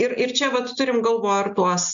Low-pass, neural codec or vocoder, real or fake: 7.2 kHz; none; real